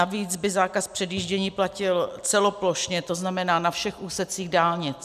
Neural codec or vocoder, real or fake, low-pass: none; real; 14.4 kHz